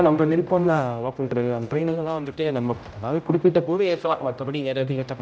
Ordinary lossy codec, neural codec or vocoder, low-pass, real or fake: none; codec, 16 kHz, 0.5 kbps, X-Codec, HuBERT features, trained on general audio; none; fake